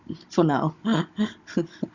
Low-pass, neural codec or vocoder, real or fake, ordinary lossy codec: 7.2 kHz; codec, 16 kHz, 16 kbps, FunCodec, trained on Chinese and English, 50 frames a second; fake; Opus, 64 kbps